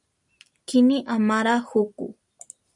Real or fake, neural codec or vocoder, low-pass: real; none; 10.8 kHz